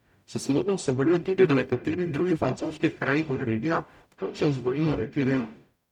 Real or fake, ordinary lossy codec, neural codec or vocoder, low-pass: fake; none; codec, 44.1 kHz, 0.9 kbps, DAC; 19.8 kHz